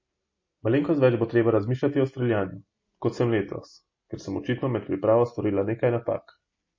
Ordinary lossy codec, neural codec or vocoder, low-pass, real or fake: MP3, 32 kbps; none; 7.2 kHz; real